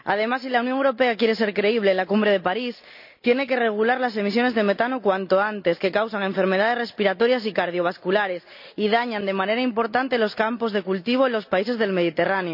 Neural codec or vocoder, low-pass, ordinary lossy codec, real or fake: none; 5.4 kHz; none; real